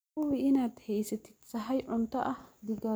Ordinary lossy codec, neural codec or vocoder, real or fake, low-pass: none; none; real; none